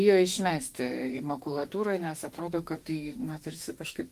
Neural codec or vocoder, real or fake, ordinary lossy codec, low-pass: autoencoder, 48 kHz, 32 numbers a frame, DAC-VAE, trained on Japanese speech; fake; Opus, 32 kbps; 14.4 kHz